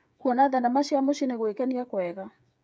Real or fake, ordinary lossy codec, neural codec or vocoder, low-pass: fake; none; codec, 16 kHz, 8 kbps, FreqCodec, smaller model; none